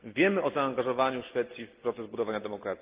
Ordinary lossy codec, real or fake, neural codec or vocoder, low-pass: Opus, 32 kbps; real; none; 3.6 kHz